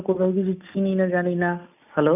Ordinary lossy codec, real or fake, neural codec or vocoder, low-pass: none; real; none; 3.6 kHz